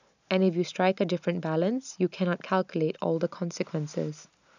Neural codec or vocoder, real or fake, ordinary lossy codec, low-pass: none; real; none; 7.2 kHz